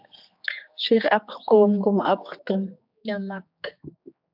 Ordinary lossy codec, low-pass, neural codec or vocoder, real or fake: AAC, 48 kbps; 5.4 kHz; codec, 16 kHz, 2 kbps, X-Codec, HuBERT features, trained on general audio; fake